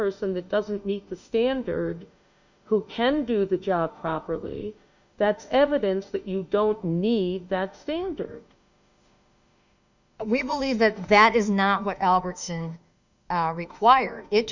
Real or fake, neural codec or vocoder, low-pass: fake; autoencoder, 48 kHz, 32 numbers a frame, DAC-VAE, trained on Japanese speech; 7.2 kHz